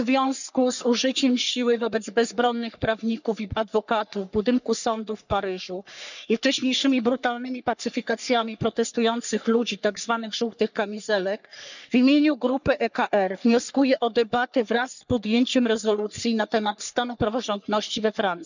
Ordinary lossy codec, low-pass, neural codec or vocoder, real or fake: none; 7.2 kHz; codec, 44.1 kHz, 3.4 kbps, Pupu-Codec; fake